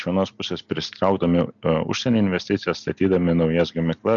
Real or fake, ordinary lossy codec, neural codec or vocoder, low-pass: real; MP3, 96 kbps; none; 7.2 kHz